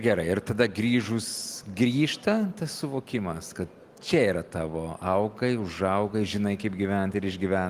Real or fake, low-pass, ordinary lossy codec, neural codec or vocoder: real; 14.4 kHz; Opus, 24 kbps; none